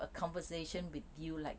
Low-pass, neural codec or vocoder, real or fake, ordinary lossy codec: none; none; real; none